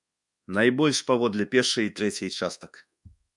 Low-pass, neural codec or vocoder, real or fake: 10.8 kHz; codec, 24 kHz, 1.2 kbps, DualCodec; fake